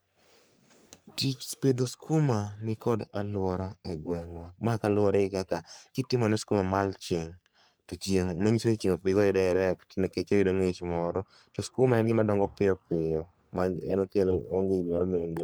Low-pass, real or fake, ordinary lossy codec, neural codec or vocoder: none; fake; none; codec, 44.1 kHz, 3.4 kbps, Pupu-Codec